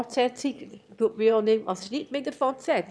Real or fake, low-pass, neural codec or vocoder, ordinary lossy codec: fake; none; autoencoder, 22.05 kHz, a latent of 192 numbers a frame, VITS, trained on one speaker; none